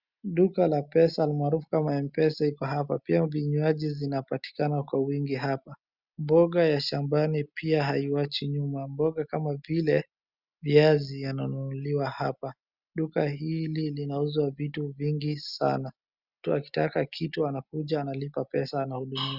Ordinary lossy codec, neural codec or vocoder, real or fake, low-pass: Opus, 64 kbps; none; real; 5.4 kHz